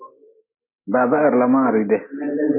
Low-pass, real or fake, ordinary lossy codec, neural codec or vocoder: 3.6 kHz; real; MP3, 16 kbps; none